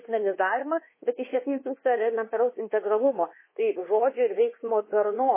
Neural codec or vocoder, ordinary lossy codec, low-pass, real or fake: codec, 24 kHz, 1.2 kbps, DualCodec; MP3, 16 kbps; 3.6 kHz; fake